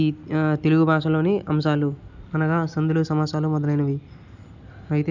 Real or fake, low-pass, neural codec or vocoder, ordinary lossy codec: real; 7.2 kHz; none; none